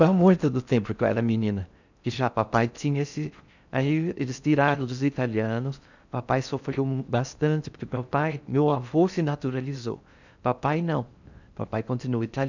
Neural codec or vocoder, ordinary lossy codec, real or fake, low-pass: codec, 16 kHz in and 24 kHz out, 0.6 kbps, FocalCodec, streaming, 4096 codes; none; fake; 7.2 kHz